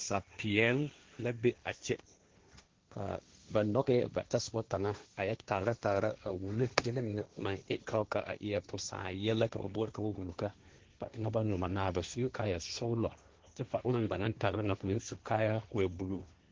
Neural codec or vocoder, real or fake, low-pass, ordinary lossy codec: codec, 16 kHz, 1.1 kbps, Voila-Tokenizer; fake; 7.2 kHz; Opus, 16 kbps